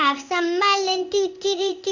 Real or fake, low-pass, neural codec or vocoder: fake; 7.2 kHz; codec, 16 kHz in and 24 kHz out, 1 kbps, XY-Tokenizer